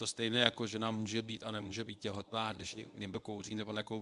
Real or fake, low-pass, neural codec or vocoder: fake; 10.8 kHz; codec, 24 kHz, 0.9 kbps, WavTokenizer, medium speech release version 1